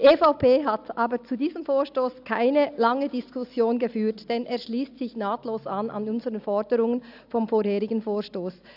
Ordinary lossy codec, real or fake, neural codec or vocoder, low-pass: AAC, 48 kbps; real; none; 5.4 kHz